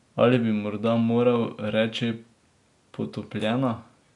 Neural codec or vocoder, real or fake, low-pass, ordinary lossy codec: none; real; 10.8 kHz; none